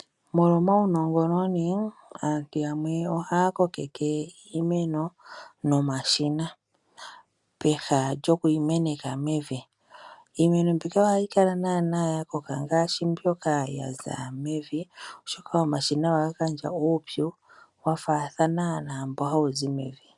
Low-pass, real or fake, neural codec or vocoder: 10.8 kHz; real; none